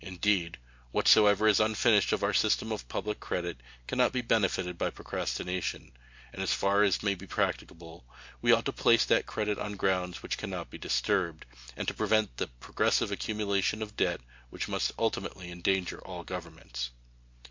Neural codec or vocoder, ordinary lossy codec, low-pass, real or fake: none; MP3, 48 kbps; 7.2 kHz; real